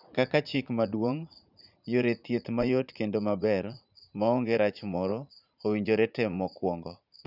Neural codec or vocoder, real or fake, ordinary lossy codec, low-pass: vocoder, 44.1 kHz, 80 mel bands, Vocos; fake; none; 5.4 kHz